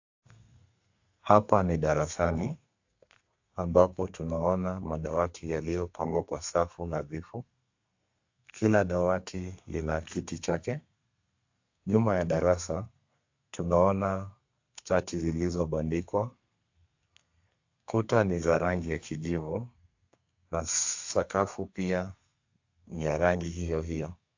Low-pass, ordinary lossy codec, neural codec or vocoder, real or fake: 7.2 kHz; AAC, 48 kbps; codec, 32 kHz, 1.9 kbps, SNAC; fake